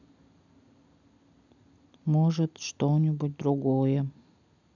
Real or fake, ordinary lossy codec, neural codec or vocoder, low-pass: real; none; none; 7.2 kHz